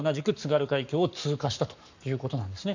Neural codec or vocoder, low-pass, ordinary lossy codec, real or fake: codec, 44.1 kHz, 7.8 kbps, Pupu-Codec; 7.2 kHz; none; fake